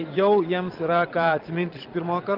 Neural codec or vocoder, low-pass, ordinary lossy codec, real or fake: vocoder, 22.05 kHz, 80 mel bands, Vocos; 5.4 kHz; Opus, 32 kbps; fake